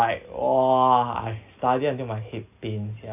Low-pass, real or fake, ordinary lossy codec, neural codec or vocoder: 3.6 kHz; real; none; none